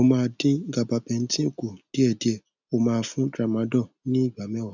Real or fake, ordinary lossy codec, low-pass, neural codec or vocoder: real; none; 7.2 kHz; none